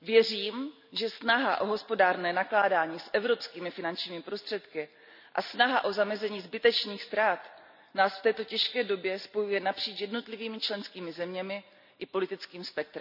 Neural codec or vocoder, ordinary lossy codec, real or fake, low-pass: none; none; real; 5.4 kHz